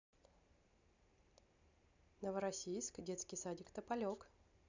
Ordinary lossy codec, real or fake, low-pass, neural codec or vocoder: none; real; 7.2 kHz; none